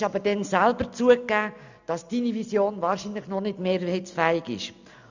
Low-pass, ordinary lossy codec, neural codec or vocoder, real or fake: 7.2 kHz; none; none; real